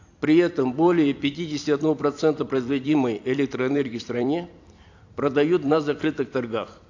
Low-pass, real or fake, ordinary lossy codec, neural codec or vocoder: 7.2 kHz; fake; AAC, 48 kbps; vocoder, 44.1 kHz, 128 mel bands every 256 samples, BigVGAN v2